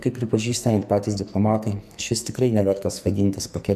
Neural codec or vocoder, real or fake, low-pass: codec, 44.1 kHz, 2.6 kbps, SNAC; fake; 14.4 kHz